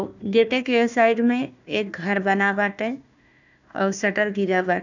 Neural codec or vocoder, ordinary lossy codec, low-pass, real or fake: codec, 16 kHz, 1 kbps, FunCodec, trained on Chinese and English, 50 frames a second; none; 7.2 kHz; fake